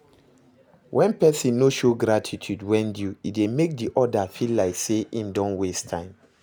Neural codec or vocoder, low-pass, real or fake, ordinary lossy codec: none; none; real; none